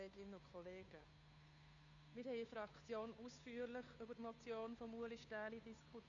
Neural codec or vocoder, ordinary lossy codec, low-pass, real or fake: codec, 16 kHz, 2 kbps, FunCodec, trained on Chinese and English, 25 frames a second; none; 7.2 kHz; fake